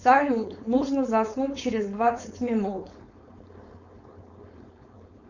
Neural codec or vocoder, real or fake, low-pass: codec, 16 kHz, 4.8 kbps, FACodec; fake; 7.2 kHz